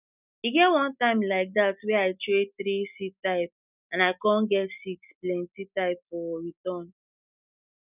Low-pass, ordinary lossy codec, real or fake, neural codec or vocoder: 3.6 kHz; none; real; none